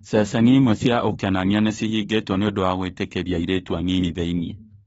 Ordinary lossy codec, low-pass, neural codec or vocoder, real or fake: AAC, 24 kbps; 19.8 kHz; autoencoder, 48 kHz, 32 numbers a frame, DAC-VAE, trained on Japanese speech; fake